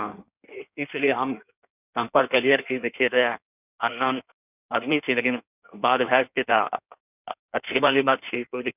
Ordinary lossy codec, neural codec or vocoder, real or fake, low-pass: none; codec, 16 kHz in and 24 kHz out, 1.1 kbps, FireRedTTS-2 codec; fake; 3.6 kHz